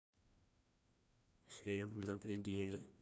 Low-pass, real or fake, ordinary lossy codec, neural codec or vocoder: none; fake; none; codec, 16 kHz, 1 kbps, FreqCodec, larger model